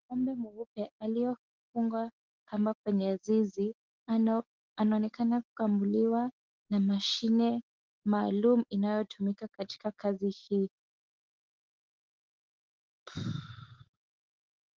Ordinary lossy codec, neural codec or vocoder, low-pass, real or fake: Opus, 16 kbps; none; 7.2 kHz; real